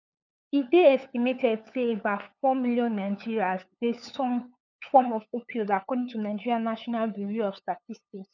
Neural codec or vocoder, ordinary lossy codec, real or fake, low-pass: codec, 16 kHz, 8 kbps, FunCodec, trained on LibriTTS, 25 frames a second; none; fake; 7.2 kHz